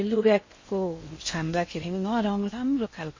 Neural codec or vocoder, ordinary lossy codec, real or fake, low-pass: codec, 16 kHz in and 24 kHz out, 0.6 kbps, FocalCodec, streaming, 4096 codes; MP3, 32 kbps; fake; 7.2 kHz